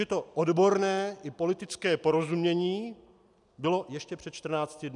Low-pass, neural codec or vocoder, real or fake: 10.8 kHz; none; real